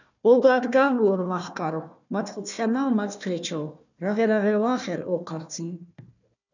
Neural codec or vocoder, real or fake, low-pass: codec, 16 kHz, 1 kbps, FunCodec, trained on Chinese and English, 50 frames a second; fake; 7.2 kHz